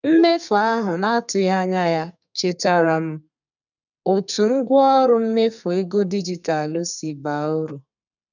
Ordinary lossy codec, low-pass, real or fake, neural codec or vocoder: none; 7.2 kHz; fake; codec, 32 kHz, 1.9 kbps, SNAC